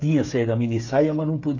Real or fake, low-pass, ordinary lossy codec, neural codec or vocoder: fake; 7.2 kHz; AAC, 48 kbps; codec, 16 kHz, 8 kbps, FreqCodec, smaller model